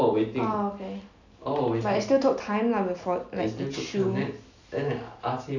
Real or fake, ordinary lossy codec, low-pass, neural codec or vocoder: real; none; 7.2 kHz; none